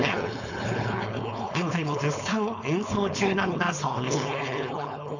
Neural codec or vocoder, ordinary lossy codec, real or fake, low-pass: codec, 16 kHz, 4.8 kbps, FACodec; none; fake; 7.2 kHz